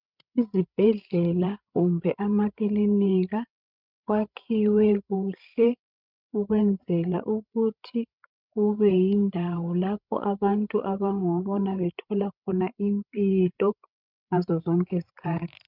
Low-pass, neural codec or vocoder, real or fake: 5.4 kHz; codec, 16 kHz, 16 kbps, FreqCodec, larger model; fake